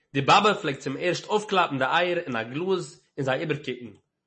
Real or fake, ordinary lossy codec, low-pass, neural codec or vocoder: real; MP3, 32 kbps; 10.8 kHz; none